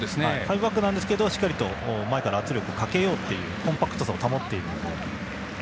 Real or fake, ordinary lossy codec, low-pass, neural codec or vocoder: real; none; none; none